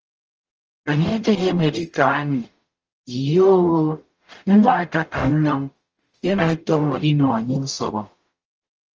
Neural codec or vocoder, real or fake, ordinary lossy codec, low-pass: codec, 44.1 kHz, 0.9 kbps, DAC; fake; Opus, 24 kbps; 7.2 kHz